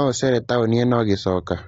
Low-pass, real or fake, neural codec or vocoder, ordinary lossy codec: 9.9 kHz; real; none; AAC, 24 kbps